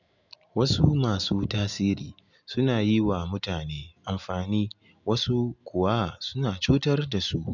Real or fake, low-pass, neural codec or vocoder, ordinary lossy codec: real; 7.2 kHz; none; none